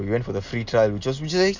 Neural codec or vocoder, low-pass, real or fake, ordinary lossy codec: none; 7.2 kHz; real; none